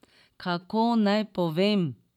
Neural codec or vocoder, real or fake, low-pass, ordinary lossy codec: vocoder, 44.1 kHz, 128 mel bands every 512 samples, BigVGAN v2; fake; 19.8 kHz; none